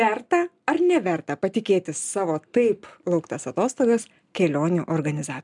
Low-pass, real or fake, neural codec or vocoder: 10.8 kHz; real; none